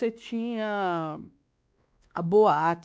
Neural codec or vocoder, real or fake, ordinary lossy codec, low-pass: codec, 16 kHz, 2 kbps, X-Codec, WavLM features, trained on Multilingual LibriSpeech; fake; none; none